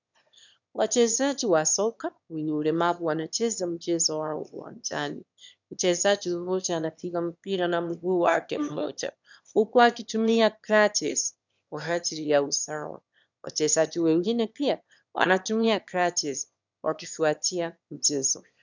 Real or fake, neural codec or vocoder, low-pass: fake; autoencoder, 22.05 kHz, a latent of 192 numbers a frame, VITS, trained on one speaker; 7.2 kHz